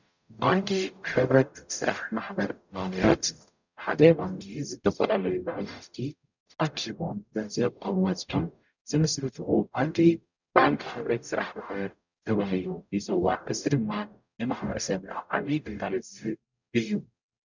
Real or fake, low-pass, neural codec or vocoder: fake; 7.2 kHz; codec, 44.1 kHz, 0.9 kbps, DAC